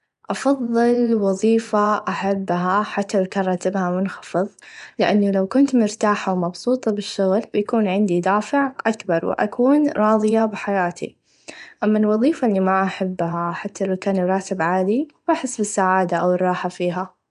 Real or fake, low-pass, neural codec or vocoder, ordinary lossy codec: fake; 10.8 kHz; vocoder, 24 kHz, 100 mel bands, Vocos; none